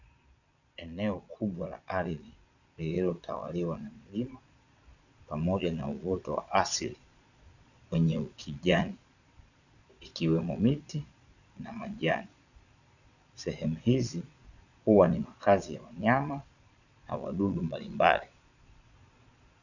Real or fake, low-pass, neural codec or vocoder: fake; 7.2 kHz; vocoder, 22.05 kHz, 80 mel bands, Vocos